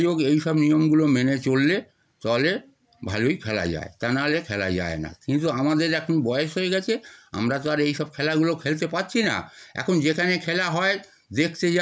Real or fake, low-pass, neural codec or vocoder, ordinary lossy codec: real; none; none; none